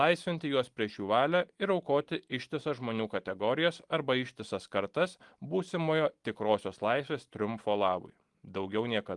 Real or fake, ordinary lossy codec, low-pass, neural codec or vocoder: real; Opus, 24 kbps; 10.8 kHz; none